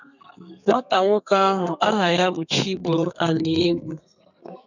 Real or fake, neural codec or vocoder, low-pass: fake; codec, 32 kHz, 1.9 kbps, SNAC; 7.2 kHz